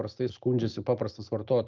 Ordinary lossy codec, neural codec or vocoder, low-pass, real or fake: Opus, 24 kbps; none; 7.2 kHz; real